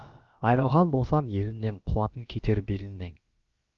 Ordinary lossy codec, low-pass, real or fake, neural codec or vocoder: Opus, 32 kbps; 7.2 kHz; fake; codec, 16 kHz, about 1 kbps, DyCAST, with the encoder's durations